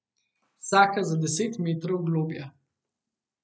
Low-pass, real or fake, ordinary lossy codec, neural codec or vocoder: none; real; none; none